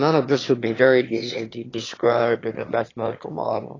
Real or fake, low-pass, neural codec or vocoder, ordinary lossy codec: fake; 7.2 kHz; autoencoder, 22.05 kHz, a latent of 192 numbers a frame, VITS, trained on one speaker; AAC, 32 kbps